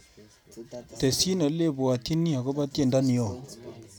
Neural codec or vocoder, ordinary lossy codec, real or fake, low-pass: none; none; real; none